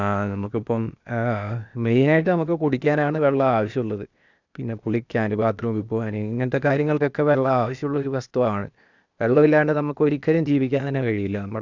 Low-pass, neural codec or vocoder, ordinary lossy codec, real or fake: 7.2 kHz; codec, 16 kHz, 0.8 kbps, ZipCodec; none; fake